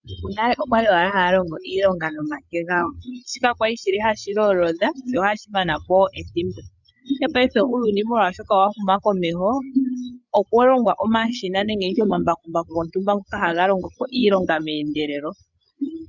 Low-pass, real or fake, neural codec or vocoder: 7.2 kHz; fake; codec, 16 kHz, 8 kbps, FreqCodec, larger model